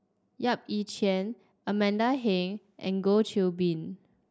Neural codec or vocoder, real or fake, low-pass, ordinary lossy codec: none; real; none; none